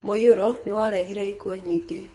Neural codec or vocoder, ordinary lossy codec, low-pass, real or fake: codec, 24 kHz, 3 kbps, HILCodec; MP3, 64 kbps; 10.8 kHz; fake